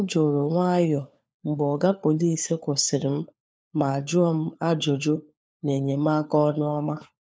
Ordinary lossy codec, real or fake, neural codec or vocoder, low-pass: none; fake; codec, 16 kHz, 4 kbps, FunCodec, trained on LibriTTS, 50 frames a second; none